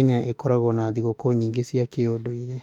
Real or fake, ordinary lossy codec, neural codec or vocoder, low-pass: fake; none; autoencoder, 48 kHz, 32 numbers a frame, DAC-VAE, trained on Japanese speech; 19.8 kHz